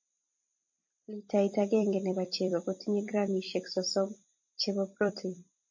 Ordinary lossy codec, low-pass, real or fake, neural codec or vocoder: MP3, 32 kbps; 7.2 kHz; fake; vocoder, 44.1 kHz, 128 mel bands every 256 samples, BigVGAN v2